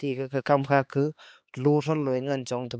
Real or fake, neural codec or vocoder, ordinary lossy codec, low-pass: fake; codec, 16 kHz, 2 kbps, X-Codec, HuBERT features, trained on LibriSpeech; none; none